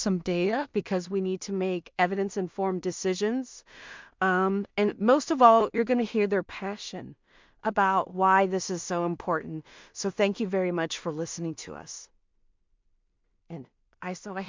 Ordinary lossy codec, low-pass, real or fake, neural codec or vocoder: MP3, 64 kbps; 7.2 kHz; fake; codec, 16 kHz in and 24 kHz out, 0.4 kbps, LongCat-Audio-Codec, two codebook decoder